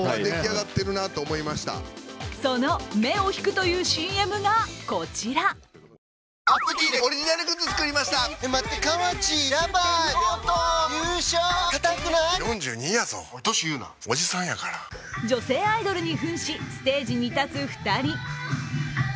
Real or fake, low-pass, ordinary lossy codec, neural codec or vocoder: real; none; none; none